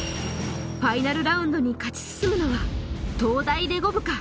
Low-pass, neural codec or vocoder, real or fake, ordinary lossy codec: none; none; real; none